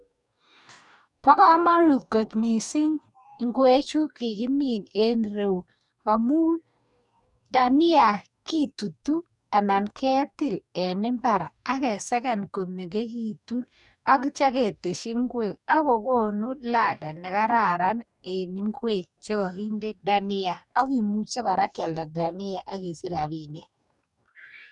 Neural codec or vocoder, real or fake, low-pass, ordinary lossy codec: codec, 44.1 kHz, 2.6 kbps, DAC; fake; 10.8 kHz; none